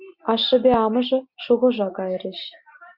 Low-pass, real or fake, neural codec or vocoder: 5.4 kHz; real; none